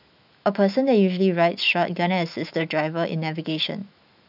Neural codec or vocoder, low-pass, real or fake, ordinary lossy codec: autoencoder, 48 kHz, 128 numbers a frame, DAC-VAE, trained on Japanese speech; 5.4 kHz; fake; none